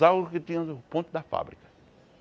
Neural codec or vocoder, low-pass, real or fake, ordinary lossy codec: none; none; real; none